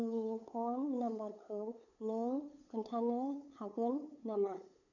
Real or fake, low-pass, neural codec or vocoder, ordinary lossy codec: fake; 7.2 kHz; codec, 16 kHz, 8 kbps, FunCodec, trained on LibriTTS, 25 frames a second; none